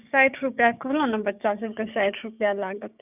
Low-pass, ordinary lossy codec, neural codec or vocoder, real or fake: 3.6 kHz; none; codec, 16 kHz, 6 kbps, DAC; fake